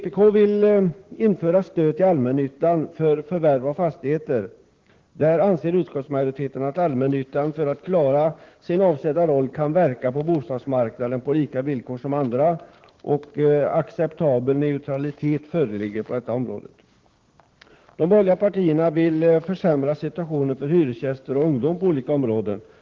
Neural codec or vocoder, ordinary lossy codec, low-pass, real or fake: codec, 16 kHz, 6 kbps, DAC; Opus, 16 kbps; 7.2 kHz; fake